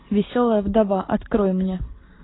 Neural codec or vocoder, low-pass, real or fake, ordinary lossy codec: none; 7.2 kHz; real; AAC, 16 kbps